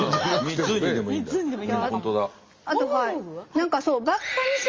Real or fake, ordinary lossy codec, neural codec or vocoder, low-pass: real; Opus, 32 kbps; none; 7.2 kHz